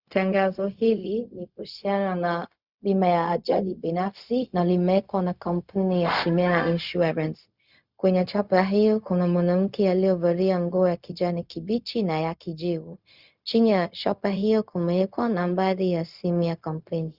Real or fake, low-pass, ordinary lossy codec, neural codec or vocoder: fake; 5.4 kHz; Opus, 64 kbps; codec, 16 kHz, 0.4 kbps, LongCat-Audio-Codec